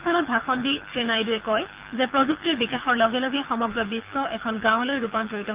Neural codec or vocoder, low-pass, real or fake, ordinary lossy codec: codec, 24 kHz, 6 kbps, HILCodec; 3.6 kHz; fake; Opus, 32 kbps